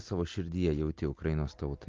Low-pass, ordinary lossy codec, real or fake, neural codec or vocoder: 7.2 kHz; Opus, 32 kbps; real; none